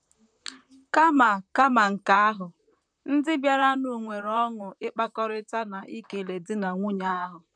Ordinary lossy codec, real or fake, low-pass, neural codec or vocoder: none; fake; 9.9 kHz; vocoder, 44.1 kHz, 128 mel bands, Pupu-Vocoder